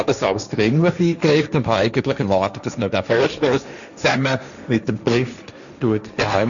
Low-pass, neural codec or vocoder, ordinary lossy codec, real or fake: 7.2 kHz; codec, 16 kHz, 1.1 kbps, Voila-Tokenizer; none; fake